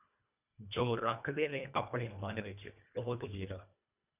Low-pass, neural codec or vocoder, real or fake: 3.6 kHz; codec, 24 kHz, 1.5 kbps, HILCodec; fake